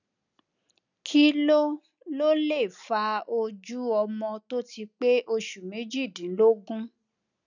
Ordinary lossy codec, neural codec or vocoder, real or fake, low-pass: none; none; real; 7.2 kHz